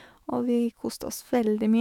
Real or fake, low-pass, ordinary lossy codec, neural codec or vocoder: fake; 19.8 kHz; none; autoencoder, 48 kHz, 128 numbers a frame, DAC-VAE, trained on Japanese speech